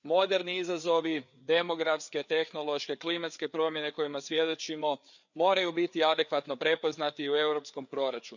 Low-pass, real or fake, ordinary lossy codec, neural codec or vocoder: 7.2 kHz; fake; none; codec, 16 kHz, 4 kbps, FreqCodec, larger model